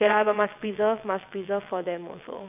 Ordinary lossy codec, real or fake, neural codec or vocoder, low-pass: none; fake; vocoder, 22.05 kHz, 80 mel bands, WaveNeXt; 3.6 kHz